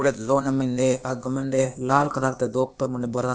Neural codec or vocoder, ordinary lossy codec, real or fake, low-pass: codec, 16 kHz, 0.8 kbps, ZipCodec; none; fake; none